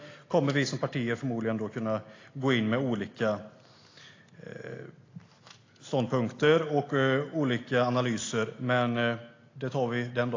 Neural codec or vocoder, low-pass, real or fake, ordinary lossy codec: none; 7.2 kHz; real; AAC, 32 kbps